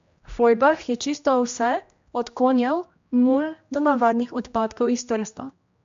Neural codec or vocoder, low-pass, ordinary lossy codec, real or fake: codec, 16 kHz, 1 kbps, X-Codec, HuBERT features, trained on general audio; 7.2 kHz; MP3, 48 kbps; fake